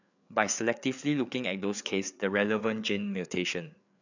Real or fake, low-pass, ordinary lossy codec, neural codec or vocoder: fake; 7.2 kHz; none; codec, 16 kHz, 4 kbps, FreqCodec, larger model